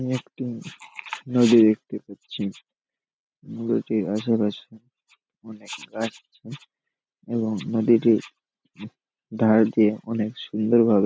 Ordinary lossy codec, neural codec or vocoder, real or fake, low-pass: none; none; real; none